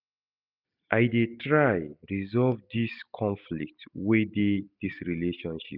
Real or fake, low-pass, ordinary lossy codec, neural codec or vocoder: real; 5.4 kHz; none; none